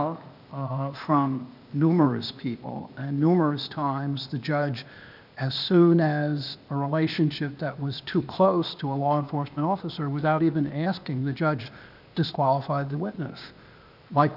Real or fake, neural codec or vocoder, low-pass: fake; codec, 16 kHz, 0.8 kbps, ZipCodec; 5.4 kHz